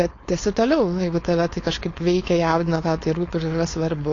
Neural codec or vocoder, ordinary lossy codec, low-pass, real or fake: codec, 16 kHz, 4.8 kbps, FACodec; AAC, 32 kbps; 7.2 kHz; fake